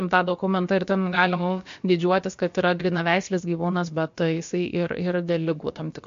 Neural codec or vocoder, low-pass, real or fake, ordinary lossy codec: codec, 16 kHz, about 1 kbps, DyCAST, with the encoder's durations; 7.2 kHz; fake; MP3, 48 kbps